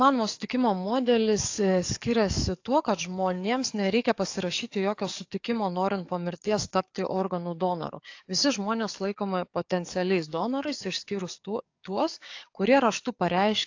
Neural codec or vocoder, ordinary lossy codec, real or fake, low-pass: codec, 44.1 kHz, 7.8 kbps, DAC; AAC, 48 kbps; fake; 7.2 kHz